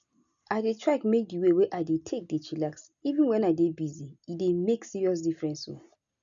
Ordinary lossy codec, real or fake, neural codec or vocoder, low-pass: none; real; none; 7.2 kHz